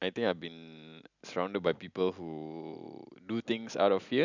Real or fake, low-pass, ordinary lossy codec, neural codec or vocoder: real; 7.2 kHz; none; none